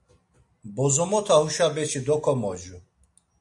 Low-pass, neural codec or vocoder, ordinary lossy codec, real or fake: 10.8 kHz; none; AAC, 64 kbps; real